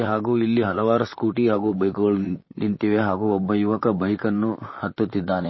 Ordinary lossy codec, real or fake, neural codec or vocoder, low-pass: MP3, 24 kbps; fake; vocoder, 44.1 kHz, 128 mel bands, Pupu-Vocoder; 7.2 kHz